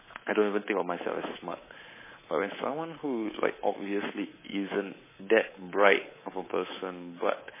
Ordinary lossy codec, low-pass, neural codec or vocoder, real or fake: MP3, 16 kbps; 3.6 kHz; codec, 24 kHz, 3.1 kbps, DualCodec; fake